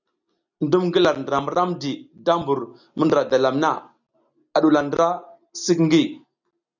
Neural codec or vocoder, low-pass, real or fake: none; 7.2 kHz; real